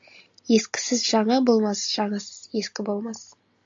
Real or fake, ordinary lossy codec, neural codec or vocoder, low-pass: real; AAC, 64 kbps; none; 7.2 kHz